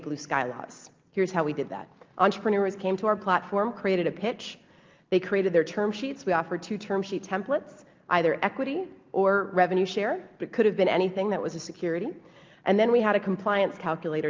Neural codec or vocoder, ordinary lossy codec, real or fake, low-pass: none; Opus, 32 kbps; real; 7.2 kHz